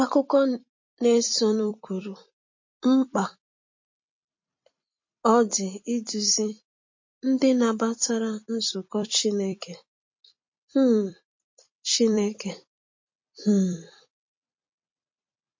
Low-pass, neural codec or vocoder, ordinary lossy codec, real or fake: 7.2 kHz; vocoder, 44.1 kHz, 128 mel bands every 256 samples, BigVGAN v2; MP3, 32 kbps; fake